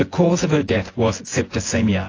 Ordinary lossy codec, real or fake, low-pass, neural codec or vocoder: AAC, 32 kbps; fake; 7.2 kHz; vocoder, 24 kHz, 100 mel bands, Vocos